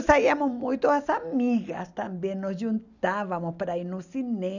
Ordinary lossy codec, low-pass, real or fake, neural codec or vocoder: none; 7.2 kHz; real; none